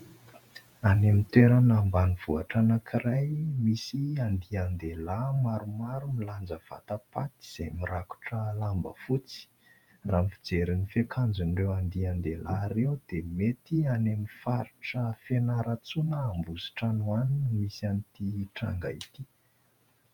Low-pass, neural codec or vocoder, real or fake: 19.8 kHz; vocoder, 48 kHz, 128 mel bands, Vocos; fake